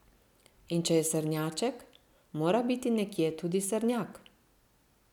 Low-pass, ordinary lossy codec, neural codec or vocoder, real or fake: 19.8 kHz; none; none; real